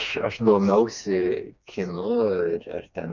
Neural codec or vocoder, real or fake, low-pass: codec, 16 kHz, 2 kbps, FreqCodec, smaller model; fake; 7.2 kHz